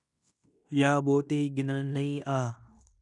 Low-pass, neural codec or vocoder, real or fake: 10.8 kHz; codec, 16 kHz in and 24 kHz out, 0.9 kbps, LongCat-Audio-Codec, fine tuned four codebook decoder; fake